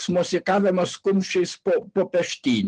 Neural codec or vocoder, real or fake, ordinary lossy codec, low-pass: none; real; Opus, 24 kbps; 9.9 kHz